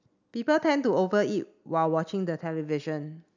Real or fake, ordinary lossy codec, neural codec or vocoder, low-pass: real; none; none; 7.2 kHz